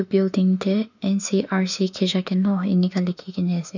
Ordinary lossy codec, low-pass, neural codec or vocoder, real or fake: none; 7.2 kHz; autoencoder, 48 kHz, 32 numbers a frame, DAC-VAE, trained on Japanese speech; fake